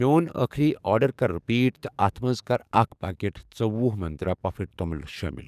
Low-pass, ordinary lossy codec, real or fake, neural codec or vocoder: 14.4 kHz; none; fake; codec, 44.1 kHz, 7.8 kbps, DAC